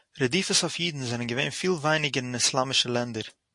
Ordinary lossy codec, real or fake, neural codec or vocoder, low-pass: MP3, 48 kbps; real; none; 10.8 kHz